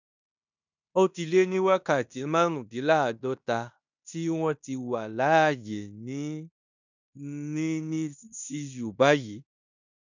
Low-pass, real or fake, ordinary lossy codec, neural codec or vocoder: 7.2 kHz; fake; none; codec, 16 kHz in and 24 kHz out, 0.9 kbps, LongCat-Audio-Codec, fine tuned four codebook decoder